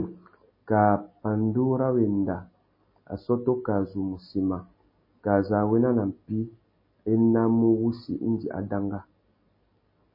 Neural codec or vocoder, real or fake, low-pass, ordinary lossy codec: none; real; 5.4 kHz; MP3, 24 kbps